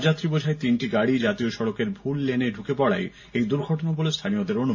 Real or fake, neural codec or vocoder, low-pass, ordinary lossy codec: real; none; 7.2 kHz; MP3, 48 kbps